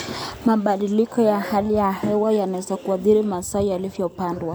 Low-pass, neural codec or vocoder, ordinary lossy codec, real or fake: none; none; none; real